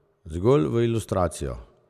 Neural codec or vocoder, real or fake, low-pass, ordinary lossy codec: none; real; 14.4 kHz; none